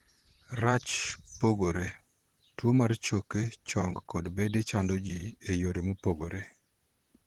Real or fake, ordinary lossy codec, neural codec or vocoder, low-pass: fake; Opus, 16 kbps; vocoder, 44.1 kHz, 128 mel bands, Pupu-Vocoder; 19.8 kHz